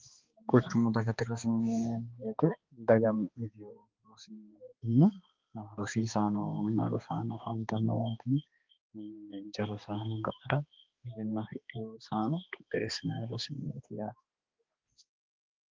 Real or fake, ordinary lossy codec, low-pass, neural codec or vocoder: fake; Opus, 32 kbps; 7.2 kHz; codec, 16 kHz, 2 kbps, X-Codec, HuBERT features, trained on balanced general audio